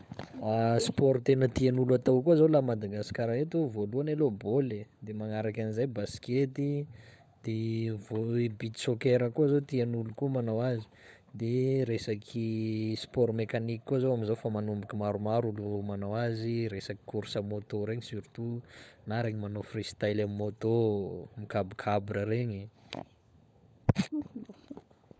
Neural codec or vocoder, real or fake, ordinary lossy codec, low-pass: codec, 16 kHz, 16 kbps, FunCodec, trained on LibriTTS, 50 frames a second; fake; none; none